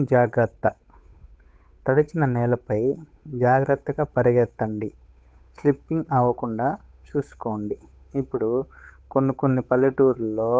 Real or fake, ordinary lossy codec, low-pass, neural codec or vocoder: fake; none; none; codec, 16 kHz, 8 kbps, FunCodec, trained on Chinese and English, 25 frames a second